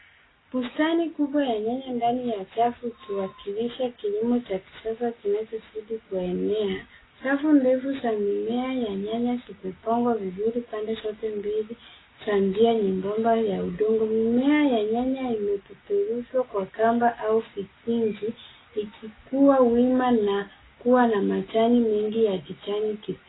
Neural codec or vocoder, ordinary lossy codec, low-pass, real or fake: none; AAC, 16 kbps; 7.2 kHz; real